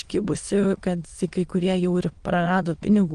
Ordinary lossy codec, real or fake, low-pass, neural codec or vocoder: Opus, 24 kbps; fake; 9.9 kHz; autoencoder, 22.05 kHz, a latent of 192 numbers a frame, VITS, trained on many speakers